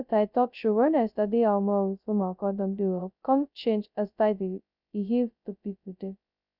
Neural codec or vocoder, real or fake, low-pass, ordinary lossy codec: codec, 16 kHz, 0.2 kbps, FocalCodec; fake; 5.4 kHz; none